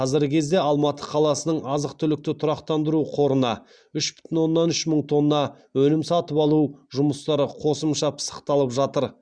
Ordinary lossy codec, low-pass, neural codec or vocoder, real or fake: Opus, 64 kbps; 9.9 kHz; none; real